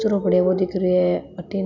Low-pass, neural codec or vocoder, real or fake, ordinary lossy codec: 7.2 kHz; none; real; none